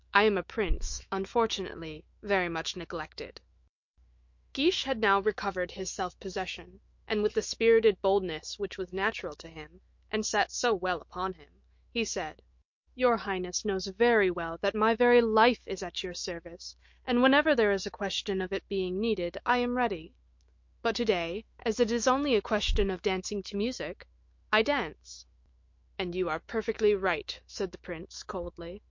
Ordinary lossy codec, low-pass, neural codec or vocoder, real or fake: MP3, 48 kbps; 7.2 kHz; autoencoder, 48 kHz, 128 numbers a frame, DAC-VAE, trained on Japanese speech; fake